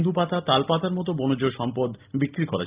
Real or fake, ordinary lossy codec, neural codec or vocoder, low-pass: real; Opus, 32 kbps; none; 3.6 kHz